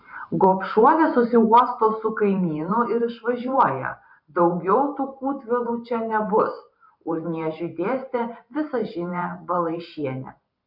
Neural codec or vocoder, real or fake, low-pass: vocoder, 44.1 kHz, 128 mel bands every 512 samples, BigVGAN v2; fake; 5.4 kHz